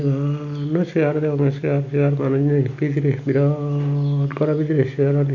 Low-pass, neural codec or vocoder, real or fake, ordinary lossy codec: 7.2 kHz; none; real; none